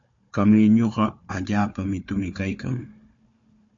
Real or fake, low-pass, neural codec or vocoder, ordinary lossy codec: fake; 7.2 kHz; codec, 16 kHz, 4 kbps, FunCodec, trained on Chinese and English, 50 frames a second; MP3, 48 kbps